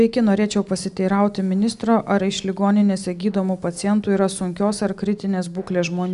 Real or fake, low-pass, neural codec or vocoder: real; 10.8 kHz; none